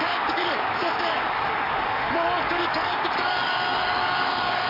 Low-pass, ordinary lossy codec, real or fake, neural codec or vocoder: 5.4 kHz; AAC, 48 kbps; fake; codec, 44.1 kHz, 7.8 kbps, DAC